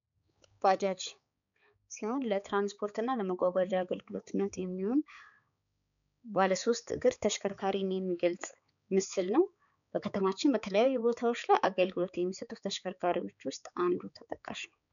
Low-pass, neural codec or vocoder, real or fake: 7.2 kHz; codec, 16 kHz, 4 kbps, X-Codec, HuBERT features, trained on balanced general audio; fake